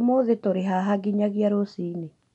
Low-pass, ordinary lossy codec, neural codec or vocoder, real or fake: 10.8 kHz; none; none; real